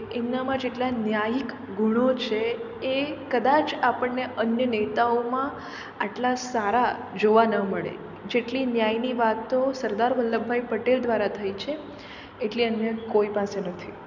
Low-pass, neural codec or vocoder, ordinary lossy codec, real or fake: 7.2 kHz; none; none; real